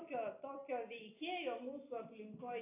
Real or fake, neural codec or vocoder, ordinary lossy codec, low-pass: real; none; AAC, 24 kbps; 3.6 kHz